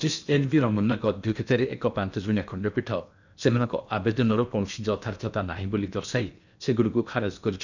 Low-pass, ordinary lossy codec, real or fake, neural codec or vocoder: 7.2 kHz; none; fake; codec, 16 kHz in and 24 kHz out, 0.8 kbps, FocalCodec, streaming, 65536 codes